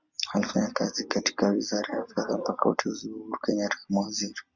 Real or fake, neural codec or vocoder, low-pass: real; none; 7.2 kHz